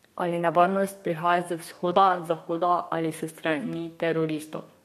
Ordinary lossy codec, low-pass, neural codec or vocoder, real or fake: MP3, 64 kbps; 14.4 kHz; codec, 32 kHz, 1.9 kbps, SNAC; fake